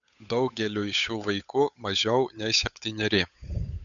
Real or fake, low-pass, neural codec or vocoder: fake; 7.2 kHz; codec, 16 kHz, 8 kbps, FunCodec, trained on Chinese and English, 25 frames a second